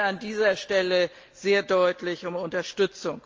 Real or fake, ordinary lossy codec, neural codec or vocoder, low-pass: real; Opus, 24 kbps; none; 7.2 kHz